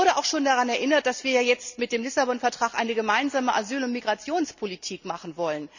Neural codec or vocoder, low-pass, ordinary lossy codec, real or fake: none; 7.2 kHz; none; real